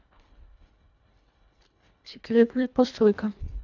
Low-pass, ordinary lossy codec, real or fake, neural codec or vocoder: 7.2 kHz; none; fake; codec, 24 kHz, 1.5 kbps, HILCodec